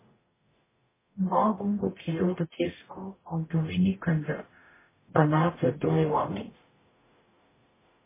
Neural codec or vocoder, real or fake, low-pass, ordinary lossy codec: codec, 44.1 kHz, 0.9 kbps, DAC; fake; 3.6 kHz; MP3, 16 kbps